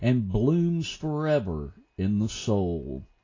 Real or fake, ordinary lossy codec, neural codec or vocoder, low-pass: real; AAC, 32 kbps; none; 7.2 kHz